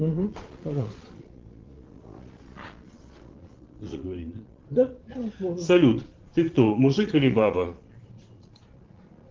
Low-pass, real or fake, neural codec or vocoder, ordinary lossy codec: 7.2 kHz; fake; vocoder, 22.05 kHz, 80 mel bands, Vocos; Opus, 16 kbps